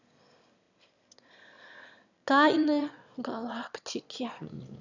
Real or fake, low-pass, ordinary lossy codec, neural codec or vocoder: fake; 7.2 kHz; none; autoencoder, 22.05 kHz, a latent of 192 numbers a frame, VITS, trained on one speaker